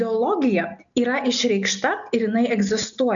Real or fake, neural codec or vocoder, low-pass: real; none; 7.2 kHz